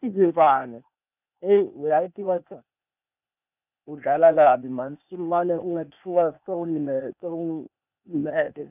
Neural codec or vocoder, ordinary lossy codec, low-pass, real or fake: codec, 16 kHz, 0.8 kbps, ZipCodec; none; 3.6 kHz; fake